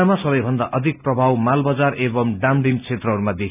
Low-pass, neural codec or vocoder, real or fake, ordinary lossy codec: 3.6 kHz; none; real; none